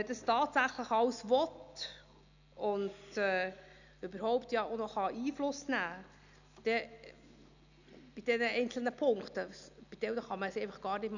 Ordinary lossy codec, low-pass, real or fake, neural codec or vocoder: none; 7.2 kHz; real; none